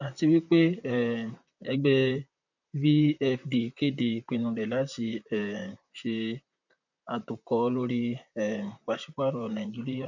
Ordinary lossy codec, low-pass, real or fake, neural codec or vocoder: none; 7.2 kHz; fake; vocoder, 44.1 kHz, 128 mel bands, Pupu-Vocoder